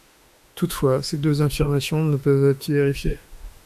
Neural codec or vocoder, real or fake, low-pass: autoencoder, 48 kHz, 32 numbers a frame, DAC-VAE, trained on Japanese speech; fake; 14.4 kHz